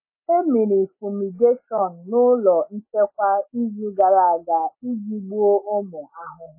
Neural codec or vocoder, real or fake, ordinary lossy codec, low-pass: none; real; MP3, 16 kbps; 3.6 kHz